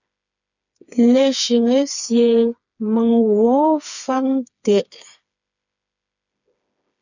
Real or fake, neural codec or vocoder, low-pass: fake; codec, 16 kHz, 4 kbps, FreqCodec, smaller model; 7.2 kHz